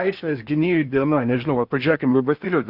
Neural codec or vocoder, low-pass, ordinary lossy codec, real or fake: codec, 16 kHz in and 24 kHz out, 0.8 kbps, FocalCodec, streaming, 65536 codes; 5.4 kHz; AAC, 48 kbps; fake